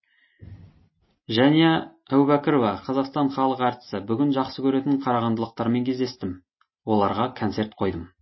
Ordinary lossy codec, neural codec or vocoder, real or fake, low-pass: MP3, 24 kbps; none; real; 7.2 kHz